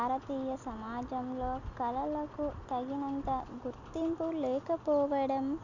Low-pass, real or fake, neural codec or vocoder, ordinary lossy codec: 7.2 kHz; real; none; none